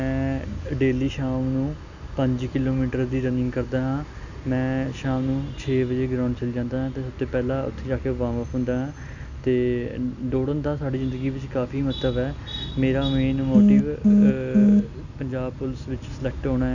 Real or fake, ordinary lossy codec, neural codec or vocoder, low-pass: real; none; none; 7.2 kHz